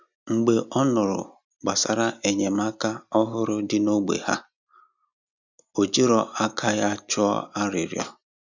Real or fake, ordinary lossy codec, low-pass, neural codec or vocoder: real; none; 7.2 kHz; none